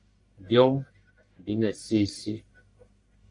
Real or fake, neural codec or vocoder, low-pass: fake; codec, 44.1 kHz, 1.7 kbps, Pupu-Codec; 10.8 kHz